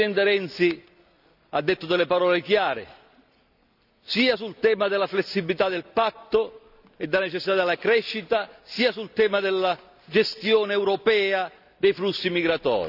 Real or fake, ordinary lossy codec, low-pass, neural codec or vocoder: real; none; 5.4 kHz; none